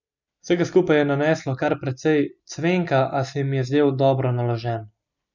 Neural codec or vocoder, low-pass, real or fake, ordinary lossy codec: none; 7.2 kHz; real; none